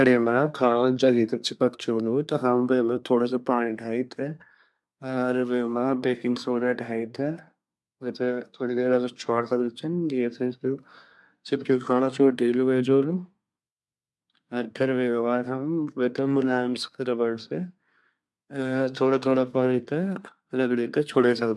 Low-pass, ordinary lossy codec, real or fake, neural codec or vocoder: none; none; fake; codec, 24 kHz, 1 kbps, SNAC